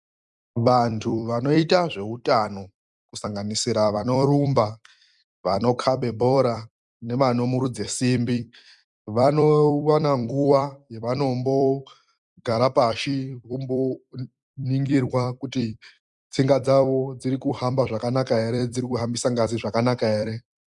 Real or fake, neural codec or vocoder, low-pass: fake; vocoder, 44.1 kHz, 128 mel bands every 256 samples, BigVGAN v2; 10.8 kHz